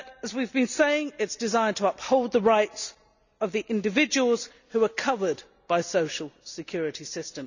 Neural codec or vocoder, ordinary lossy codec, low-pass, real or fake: none; none; 7.2 kHz; real